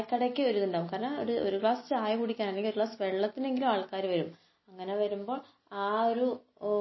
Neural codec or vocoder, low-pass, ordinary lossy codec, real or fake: none; 7.2 kHz; MP3, 24 kbps; real